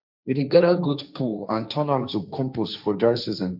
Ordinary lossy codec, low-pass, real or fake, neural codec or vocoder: none; 5.4 kHz; fake; codec, 16 kHz, 1.1 kbps, Voila-Tokenizer